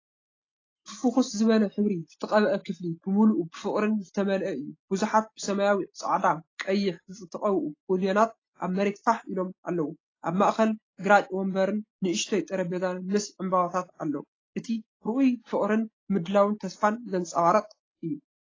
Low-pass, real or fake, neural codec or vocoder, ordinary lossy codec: 7.2 kHz; real; none; AAC, 32 kbps